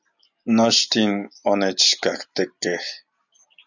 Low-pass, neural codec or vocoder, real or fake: 7.2 kHz; none; real